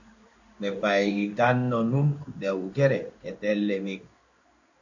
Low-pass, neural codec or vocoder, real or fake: 7.2 kHz; codec, 16 kHz in and 24 kHz out, 1 kbps, XY-Tokenizer; fake